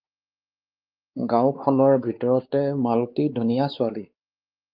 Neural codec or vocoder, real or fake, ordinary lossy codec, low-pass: codec, 16 kHz, 4 kbps, X-Codec, WavLM features, trained on Multilingual LibriSpeech; fake; Opus, 32 kbps; 5.4 kHz